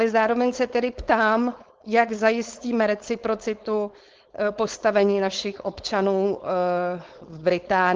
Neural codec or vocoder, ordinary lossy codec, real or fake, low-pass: codec, 16 kHz, 4.8 kbps, FACodec; Opus, 16 kbps; fake; 7.2 kHz